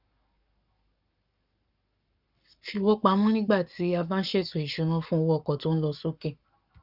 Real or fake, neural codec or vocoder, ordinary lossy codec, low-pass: fake; codec, 44.1 kHz, 7.8 kbps, Pupu-Codec; none; 5.4 kHz